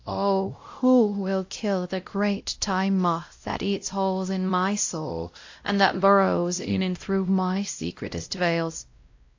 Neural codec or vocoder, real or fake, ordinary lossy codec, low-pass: codec, 16 kHz, 0.5 kbps, X-Codec, WavLM features, trained on Multilingual LibriSpeech; fake; AAC, 48 kbps; 7.2 kHz